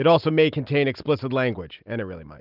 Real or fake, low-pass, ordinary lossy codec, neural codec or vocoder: real; 5.4 kHz; Opus, 24 kbps; none